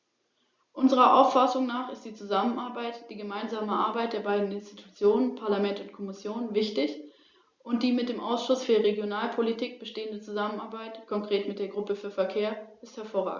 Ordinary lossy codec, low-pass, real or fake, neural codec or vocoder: Opus, 64 kbps; 7.2 kHz; real; none